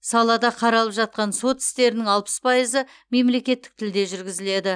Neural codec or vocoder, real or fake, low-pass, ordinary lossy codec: none; real; 9.9 kHz; none